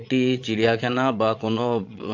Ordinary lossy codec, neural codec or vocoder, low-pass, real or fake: AAC, 48 kbps; vocoder, 22.05 kHz, 80 mel bands, Vocos; 7.2 kHz; fake